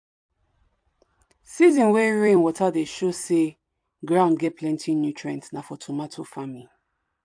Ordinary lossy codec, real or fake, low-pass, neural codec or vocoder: AAC, 64 kbps; fake; 9.9 kHz; vocoder, 44.1 kHz, 128 mel bands every 256 samples, BigVGAN v2